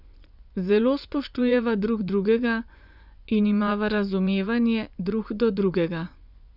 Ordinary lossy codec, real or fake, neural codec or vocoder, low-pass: none; fake; vocoder, 24 kHz, 100 mel bands, Vocos; 5.4 kHz